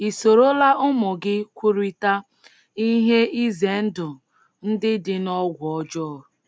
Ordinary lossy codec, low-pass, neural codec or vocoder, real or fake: none; none; none; real